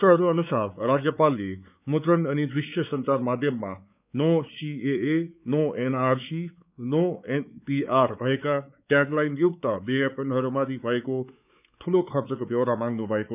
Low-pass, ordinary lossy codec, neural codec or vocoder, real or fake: 3.6 kHz; none; codec, 16 kHz, 4 kbps, X-Codec, WavLM features, trained on Multilingual LibriSpeech; fake